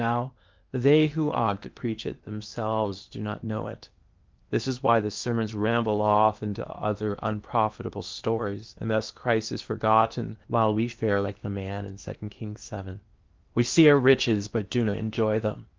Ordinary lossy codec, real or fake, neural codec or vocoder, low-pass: Opus, 24 kbps; fake; codec, 16 kHz in and 24 kHz out, 0.8 kbps, FocalCodec, streaming, 65536 codes; 7.2 kHz